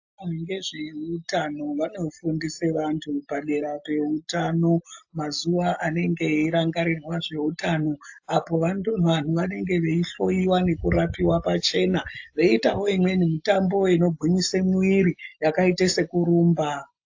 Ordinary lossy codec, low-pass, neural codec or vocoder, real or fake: AAC, 48 kbps; 7.2 kHz; none; real